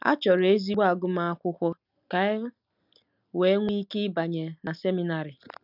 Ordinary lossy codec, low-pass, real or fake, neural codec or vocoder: none; 5.4 kHz; real; none